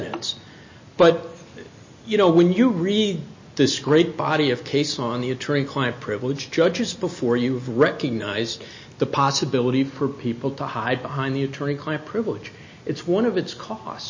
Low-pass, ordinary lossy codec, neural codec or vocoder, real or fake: 7.2 kHz; MP3, 32 kbps; none; real